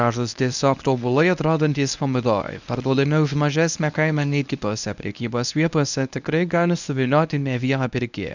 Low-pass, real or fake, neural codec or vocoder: 7.2 kHz; fake; codec, 24 kHz, 0.9 kbps, WavTokenizer, medium speech release version 1